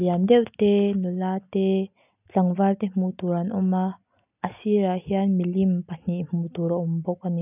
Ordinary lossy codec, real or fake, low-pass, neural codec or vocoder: none; real; 3.6 kHz; none